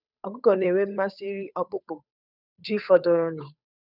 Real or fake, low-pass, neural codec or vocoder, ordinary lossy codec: fake; 5.4 kHz; codec, 16 kHz, 8 kbps, FunCodec, trained on Chinese and English, 25 frames a second; none